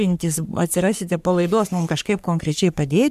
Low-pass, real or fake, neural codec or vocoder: 14.4 kHz; fake; codec, 44.1 kHz, 3.4 kbps, Pupu-Codec